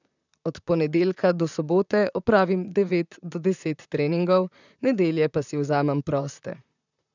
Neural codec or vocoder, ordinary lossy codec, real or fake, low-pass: vocoder, 44.1 kHz, 128 mel bands, Pupu-Vocoder; none; fake; 7.2 kHz